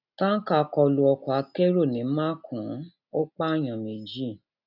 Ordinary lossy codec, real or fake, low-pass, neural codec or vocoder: none; real; 5.4 kHz; none